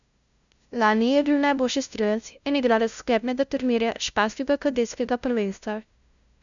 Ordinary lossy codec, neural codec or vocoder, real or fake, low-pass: none; codec, 16 kHz, 0.5 kbps, FunCodec, trained on LibriTTS, 25 frames a second; fake; 7.2 kHz